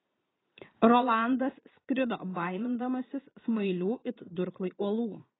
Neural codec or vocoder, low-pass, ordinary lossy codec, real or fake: vocoder, 22.05 kHz, 80 mel bands, Vocos; 7.2 kHz; AAC, 16 kbps; fake